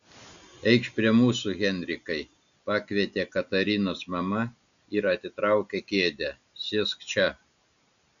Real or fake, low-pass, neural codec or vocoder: real; 7.2 kHz; none